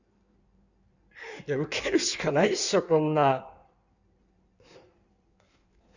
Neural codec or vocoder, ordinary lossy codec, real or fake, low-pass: codec, 16 kHz in and 24 kHz out, 1.1 kbps, FireRedTTS-2 codec; none; fake; 7.2 kHz